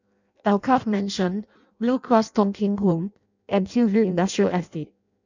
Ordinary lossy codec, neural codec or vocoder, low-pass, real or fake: none; codec, 16 kHz in and 24 kHz out, 0.6 kbps, FireRedTTS-2 codec; 7.2 kHz; fake